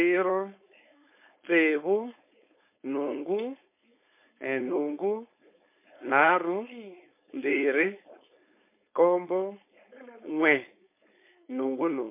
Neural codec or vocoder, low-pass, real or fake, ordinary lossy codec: codec, 16 kHz, 4.8 kbps, FACodec; 3.6 kHz; fake; MP3, 24 kbps